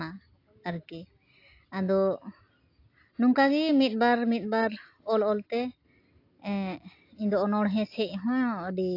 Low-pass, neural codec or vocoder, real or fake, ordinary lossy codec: 5.4 kHz; none; real; AAC, 32 kbps